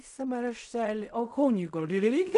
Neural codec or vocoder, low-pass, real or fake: codec, 16 kHz in and 24 kHz out, 0.4 kbps, LongCat-Audio-Codec, fine tuned four codebook decoder; 10.8 kHz; fake